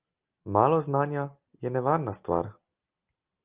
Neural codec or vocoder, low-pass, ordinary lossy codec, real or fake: none; 3.6 kHz; Opus, 24 kbps; real